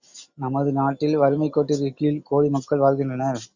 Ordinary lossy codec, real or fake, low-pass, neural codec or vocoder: Opus, 64 kbps; real; 7.2 kHz; none